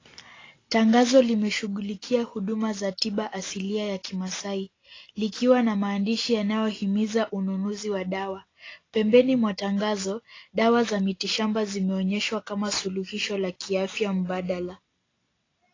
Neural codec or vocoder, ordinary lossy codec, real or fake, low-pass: none; AAC, 32 kbps; real; 7.2 kHz